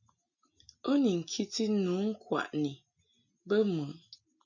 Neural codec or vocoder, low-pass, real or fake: none; 7.2 kHz; real